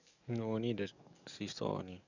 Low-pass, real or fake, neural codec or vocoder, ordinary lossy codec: 7.2 kHz; fake; codec, 44.1 kHz, 7.8 kbps, DAC; none